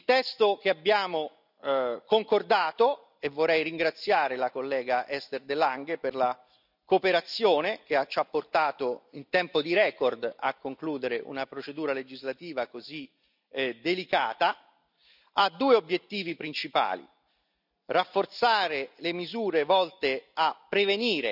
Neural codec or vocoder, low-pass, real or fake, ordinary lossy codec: none; 5.4 kHz; real; none